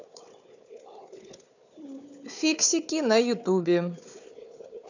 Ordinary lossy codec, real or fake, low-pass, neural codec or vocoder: none; fake; 7.2 kHz; codec, 16 kHz, 4 kbps, FunCodec, trained on Chinese and English, 50 frames a second